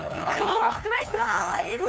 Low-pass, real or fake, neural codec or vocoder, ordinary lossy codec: none; fake; codec, 16 kHz, 2 kbps, FunCodec, trained on LibriTTS, 25 frames a second; none